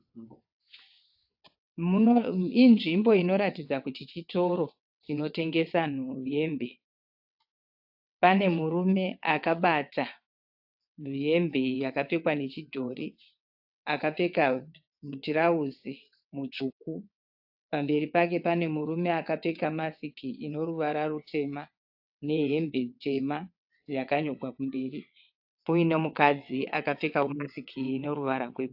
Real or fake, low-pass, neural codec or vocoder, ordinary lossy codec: fake; 5.4 kHz; vocoder, 22.05 kHz, 80 mel bands, WaveNeXt; AAC, 48 kbps